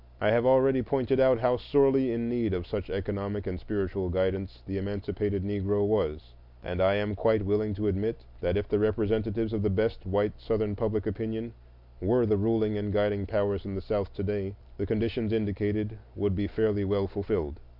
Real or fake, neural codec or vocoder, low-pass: real; none; 5.4 kHz